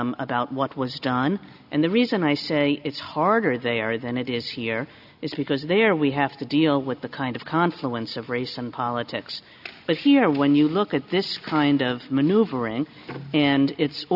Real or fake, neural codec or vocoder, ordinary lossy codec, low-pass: real; none; AAC, 48 kbps; 5.4 kHz